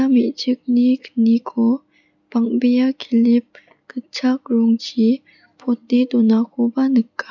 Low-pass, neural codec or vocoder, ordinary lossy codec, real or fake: 7.2 kHz; none; none; real